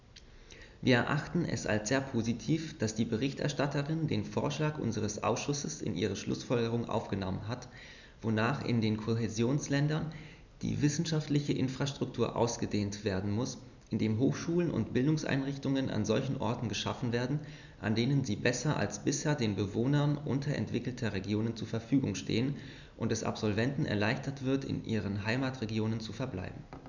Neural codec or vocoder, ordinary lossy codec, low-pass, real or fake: none; none; 7.2 kHz; real